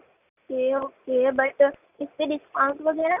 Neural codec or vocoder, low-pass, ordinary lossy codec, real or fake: none; 3.6 kHz; none; real